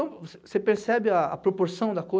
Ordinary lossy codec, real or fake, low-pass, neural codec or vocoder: none; real; none; none